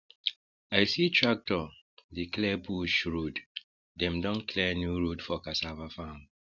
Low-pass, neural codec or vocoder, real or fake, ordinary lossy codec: 7.2 kHz; none; real; none